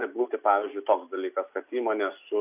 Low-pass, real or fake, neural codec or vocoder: 3.6 kHz; real; none